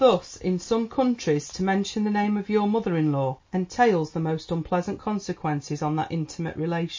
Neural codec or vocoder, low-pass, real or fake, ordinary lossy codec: none; 7.2 kHz; real; MP3, 32 kbps